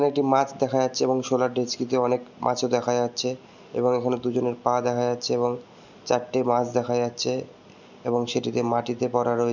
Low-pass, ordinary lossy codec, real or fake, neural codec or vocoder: 7.2 kHz; none; real; none